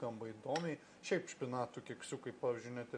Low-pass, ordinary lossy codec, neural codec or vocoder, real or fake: 9.9 kHz; MP3, 48 kbps; none; real